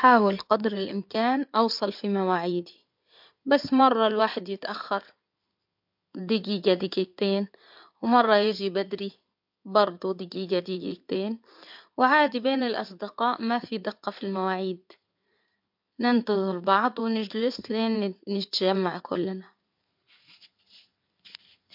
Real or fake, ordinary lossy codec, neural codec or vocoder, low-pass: fake; MP3, 48 kbps; codec, 16 kHz in and 24 kHz out, 2.2 kbps, FireRedTTS-2 codec; 5.4 kHz